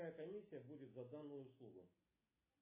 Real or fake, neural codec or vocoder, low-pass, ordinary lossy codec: real; none; 3.6 kHz; MP3, 16 kbps